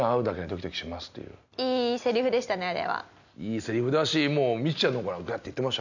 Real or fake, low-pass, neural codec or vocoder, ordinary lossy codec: real; 7.2 kHz; none; none